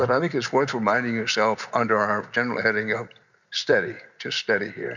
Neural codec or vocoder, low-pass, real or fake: none; 7.2 kHz; real